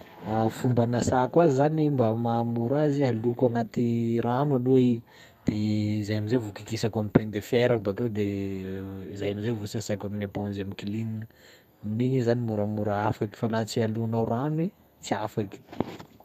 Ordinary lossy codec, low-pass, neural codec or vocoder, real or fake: none; 14.4 kHz; codec, 32 kHz, 1.9 kbps, SNAC; fake